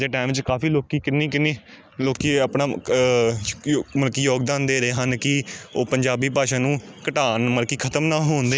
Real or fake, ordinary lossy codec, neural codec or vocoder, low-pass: real; none; none; none